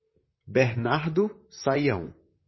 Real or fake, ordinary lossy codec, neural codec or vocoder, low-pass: fake; MP3, 24 kbps; vocoder, 44.1 kHz, 128 mel bands, Pupu-Vocoder; 7.2 kHz